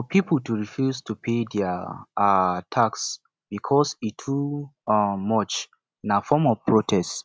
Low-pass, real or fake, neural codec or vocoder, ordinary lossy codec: none; real; none; none